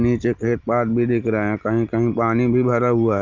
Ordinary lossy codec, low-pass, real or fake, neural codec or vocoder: Opus, 24 kbps; 7.2 kHz; real; none